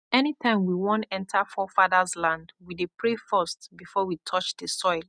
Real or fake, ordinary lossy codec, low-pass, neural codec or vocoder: real; none; 9.9 kHz; none